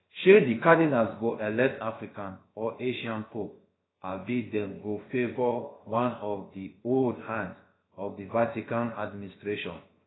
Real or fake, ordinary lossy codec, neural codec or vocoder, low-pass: fake; AAC, 16 kbps; codec, 16 kHz, about 1 kbps, DyCAST, with the encoder's durations; 7.2 kHz